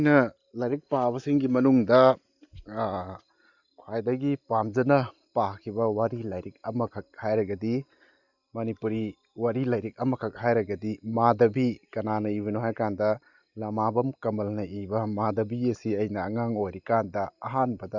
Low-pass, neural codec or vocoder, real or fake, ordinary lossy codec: 7.2 kHz; none; real; Opus, 64 kbps